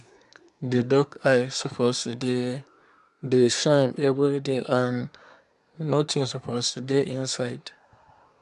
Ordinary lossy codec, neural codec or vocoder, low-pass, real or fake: none; codec, 24 kHz, 1 kbps, SNAC; 10.8 kHz; fake